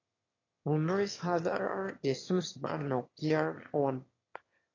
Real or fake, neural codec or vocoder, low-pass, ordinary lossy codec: fake; autoencoder, 22.05 kHz, a latent of 192 numbers a frame, VITS, trained on one speaker; 7.2 kHz; AAC, 32 kbps